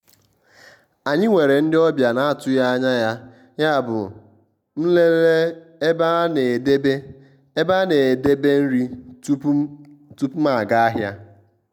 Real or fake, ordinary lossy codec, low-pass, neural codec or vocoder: real; none; 19.8 kHz; none